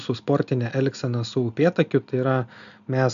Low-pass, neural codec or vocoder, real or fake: 7.2 kHz; none; real